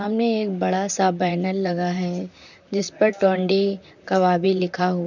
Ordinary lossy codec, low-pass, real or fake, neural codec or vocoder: none; 7.2 kHz; fake; vocoder, 44.1 kHz, 128 mel bands, Pupu-Vocoder